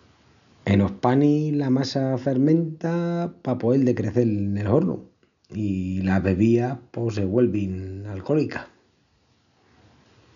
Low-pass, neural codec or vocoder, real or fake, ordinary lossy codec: 7.2 kHz; none; real; none